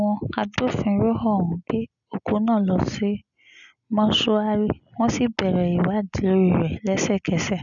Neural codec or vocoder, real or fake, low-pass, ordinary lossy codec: none; real; 7.2 kHz; none